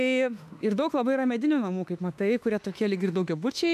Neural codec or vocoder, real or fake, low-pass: autoencoder, 48 kHz, 32 numbers a frame, DAC-VAE, trained on Japanese speech; fake; 14.4 kHz